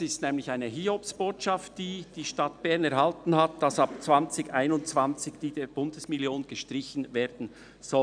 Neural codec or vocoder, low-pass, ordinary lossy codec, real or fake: none; 9.9 kHz; none; real